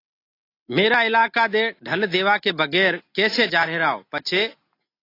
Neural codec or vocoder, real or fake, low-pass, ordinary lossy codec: none; real; 5.4 kHz; AAC, 32 kbps